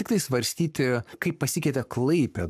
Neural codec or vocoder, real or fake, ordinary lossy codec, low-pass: codec, 44.1 kHz, 7.8 kbps, Pupu-Codec; fake; AAC, 96 kbps; 14.4 kHz